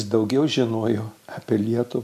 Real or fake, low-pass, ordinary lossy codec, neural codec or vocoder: real; 14.4 kHz; MP3, 96 kbps; none